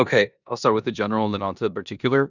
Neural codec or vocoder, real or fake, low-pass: codec, 16 kHz in and 24 kHz out, 0.9 kbps, LongCat-Audio-Codec, four codebook decoder; fake; 7.2 kHz